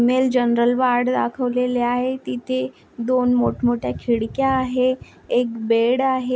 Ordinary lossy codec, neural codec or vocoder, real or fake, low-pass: none; none; real; none